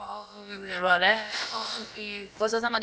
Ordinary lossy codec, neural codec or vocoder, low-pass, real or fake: none; codec, 16 kHz, about 1 kbps, DyCAST, with the encoder's durations; none; fake